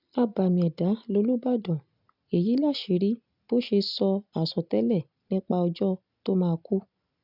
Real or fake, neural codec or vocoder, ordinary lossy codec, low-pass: real; none; none; 5.4 kHz